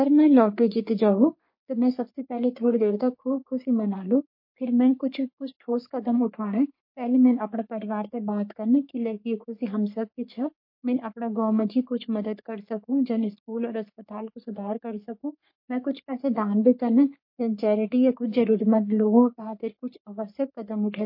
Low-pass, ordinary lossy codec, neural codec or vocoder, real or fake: 5.4 kHz; MP3, 32 kbps; codec, 44.1 kHz, 3.4 kbps, Pupu-Codec; fake